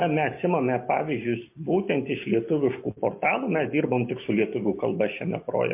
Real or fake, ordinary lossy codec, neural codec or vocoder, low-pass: real; MP3, 24 kbps; none; 3.6 kHz